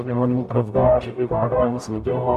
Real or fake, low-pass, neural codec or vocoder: fake; 14.4 kHz; codec, 44.1 kHz, 0.9 kbps, DAC